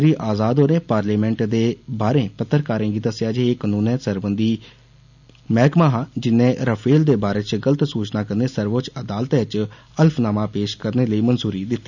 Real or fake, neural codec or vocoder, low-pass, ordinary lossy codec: real; none; 7.2 kHz; none